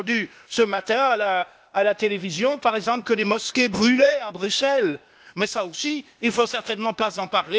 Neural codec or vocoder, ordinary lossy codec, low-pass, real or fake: codec, 16 kHz, 0.8 kbps, ZipCodec; none; none; fake